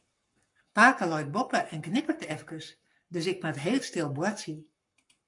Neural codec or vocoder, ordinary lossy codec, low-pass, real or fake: codec, 44.1 kHz, 7.8 kbps, Pupu-Codec; MP3, 64 kbps; 10.8 kHz; fake